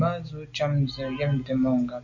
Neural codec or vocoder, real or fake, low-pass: none; real; 7.2 kHz